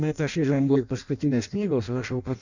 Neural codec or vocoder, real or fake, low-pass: codec, 16 kHz in and 24 kHz out, 0.6 kbps, FireRedTTS-2 codec; fake; 7.2 kHz